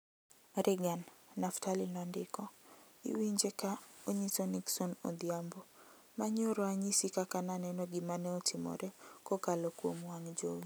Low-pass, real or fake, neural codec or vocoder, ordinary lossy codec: none; real; none; none